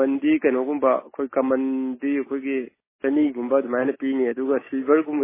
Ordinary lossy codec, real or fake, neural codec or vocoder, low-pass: MP3, 16 kbps; real; none; 3.6 kHz